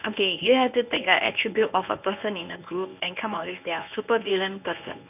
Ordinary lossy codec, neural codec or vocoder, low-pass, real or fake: none; codec, 24 kHz, 0.9 kbps, WavTokenizer, medium speech release version 1; 3.6 kHz; fake